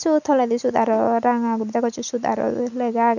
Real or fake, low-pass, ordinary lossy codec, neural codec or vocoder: real; 7.2 kHz; none; none